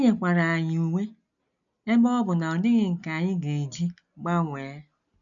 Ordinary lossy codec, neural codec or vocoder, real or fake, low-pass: none; none; real; 7.2 kHz